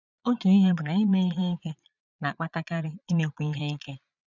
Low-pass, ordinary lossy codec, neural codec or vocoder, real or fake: 7.2 kHz; none; vocoder, 22.05 kHz, 80 mel bands, Vocos; fake